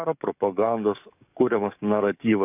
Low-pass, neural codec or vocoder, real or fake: 3.6 kHz; codec, 44.1 kHz, 7.8 kbps, DAC; fake